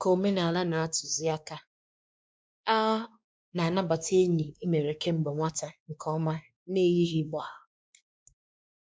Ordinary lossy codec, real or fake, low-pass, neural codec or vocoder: none; fake; none; codec, 16 kHz, 2 kbps, X-Codec, WavLM features, trained on Multilingual LibriSpeech